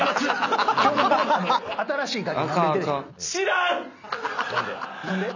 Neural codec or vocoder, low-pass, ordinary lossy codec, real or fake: none; 7.2 kHz; none; real